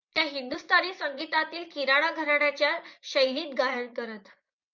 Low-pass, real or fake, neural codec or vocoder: 7.2 kHz; fake; vocoder, 24 kHz, 100 mel bands, Vocos